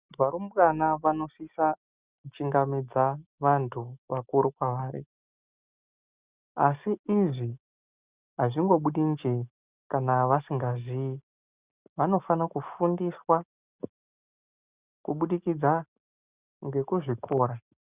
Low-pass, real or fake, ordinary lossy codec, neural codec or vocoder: 3.6 kHz; real; Opus, 64 kbps; none